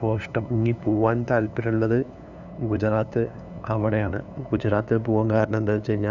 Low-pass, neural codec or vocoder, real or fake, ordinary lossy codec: 7.2 kHz; codec, 16 kHz, 2 kbps, FunCodec, trained on LibriTTS, 25 frames a second; fake; none